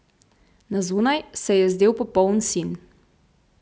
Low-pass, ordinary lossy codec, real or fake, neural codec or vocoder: none; none; real; none